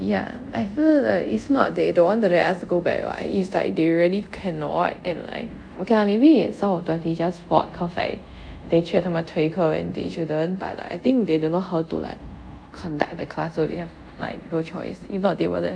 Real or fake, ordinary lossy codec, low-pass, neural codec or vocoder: fake; none; 9.9 kHz; codec, 24 kHz, 0.5 kbps, DualCodec